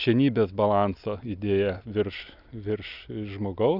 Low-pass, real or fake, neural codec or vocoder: 5.4 kHz; real; none